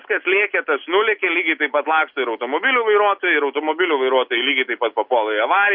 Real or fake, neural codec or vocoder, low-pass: real; none; 5.4 kHz